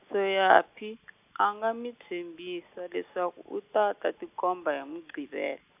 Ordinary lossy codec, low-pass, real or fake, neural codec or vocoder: AAC, 32 kbps; 3.6 kHz; real; none